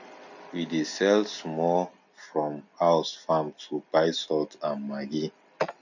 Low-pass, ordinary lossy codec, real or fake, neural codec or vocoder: 7.2 kHz; none; real; none